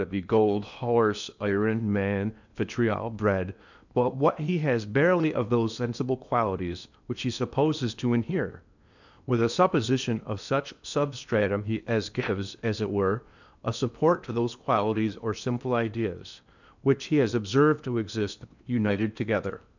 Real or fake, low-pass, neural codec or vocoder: fake; 7.2 kHz; codec, 16 kHz in and 24 kHz out, 0.8 kbps, FocalCodec, streaming, 65536 codes